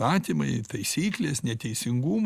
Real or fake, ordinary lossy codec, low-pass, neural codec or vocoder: real; Opus, 64 kbps; 14.4 kHz; none